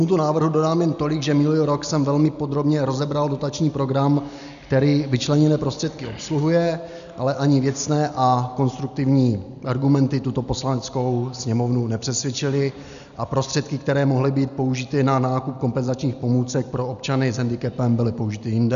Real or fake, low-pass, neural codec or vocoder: real; 7.2 kHz; none